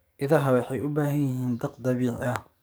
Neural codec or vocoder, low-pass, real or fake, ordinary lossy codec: codec, 44.1 kHz, 7.8 kbps, DAC; none; fake; none